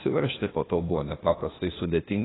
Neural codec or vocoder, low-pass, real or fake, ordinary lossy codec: codec, 16 kHz, 0.8 kbps, ZipCodec; 7.2 kHz; fake; AAC, 16 kbps